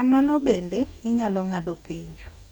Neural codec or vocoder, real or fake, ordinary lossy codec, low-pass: codec, 44.1 kHz, 2.6 kbps, DAC; fake; none; 19.8 kHz